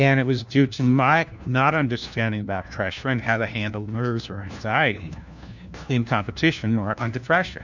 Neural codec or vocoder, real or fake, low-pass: codec, 16 kHz, 1 kbps, FunCodec, trained on LibriTTS, 50 frames a second; fake; 7.2 kHz